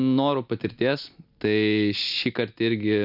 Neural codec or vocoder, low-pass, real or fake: none; 5.4 kHz; real